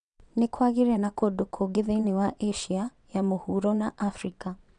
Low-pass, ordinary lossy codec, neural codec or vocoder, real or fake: 9.9 kHz; none; vocoder, 22.05 kHz, 80 mel bands, WaveNeXt; fake